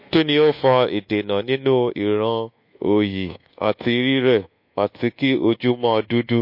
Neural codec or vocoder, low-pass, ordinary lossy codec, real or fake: codec, 24 kHz, 1.2 kbps, DualCodec; 5.4 kHz; MP3, 32 kbps; fake